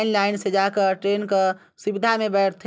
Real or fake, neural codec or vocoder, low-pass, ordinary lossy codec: real; none; none; none